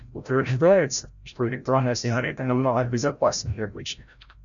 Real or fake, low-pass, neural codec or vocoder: fake; 7.2 kHz; codec, 16 kHz, 0.5 kbps, FreqCodec, larger model